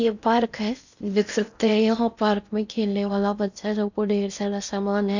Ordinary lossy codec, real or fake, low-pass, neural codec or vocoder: none; fake; 7.2 kHz; codec, 16 kHz in and 24 kHz out, 0.6 kbps, FocalCodec, streaming, 4096 codes